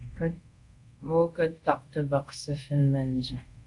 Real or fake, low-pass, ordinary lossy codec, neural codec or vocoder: fake; 10.8 kHz; AAC, 64 kbps; codec, 24 kHz, 0.5 kbps, DualCodec